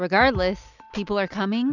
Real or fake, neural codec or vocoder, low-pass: real; none; 7.2 kHz